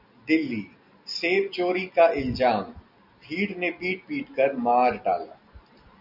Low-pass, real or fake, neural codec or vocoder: 5.4 kHz; real; none